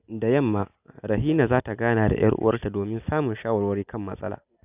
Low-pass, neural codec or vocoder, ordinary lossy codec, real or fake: 3.6 kHz; none; none; real